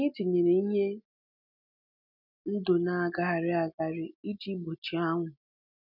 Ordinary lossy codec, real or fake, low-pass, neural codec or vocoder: none; real; 5.4 kHz; none